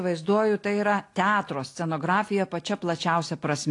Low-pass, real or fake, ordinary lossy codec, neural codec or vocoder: 10.8 kHz; real; AAC, 48 kbps; none